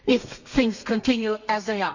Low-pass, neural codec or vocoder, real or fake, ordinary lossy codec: 7.2 kHz; codec, 32 kHz, 1.9 kbps, SNAC; fake; none